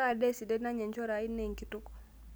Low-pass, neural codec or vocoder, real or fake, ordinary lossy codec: none; none; real; none